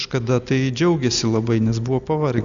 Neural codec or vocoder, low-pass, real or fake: none; 7.2 kHz; real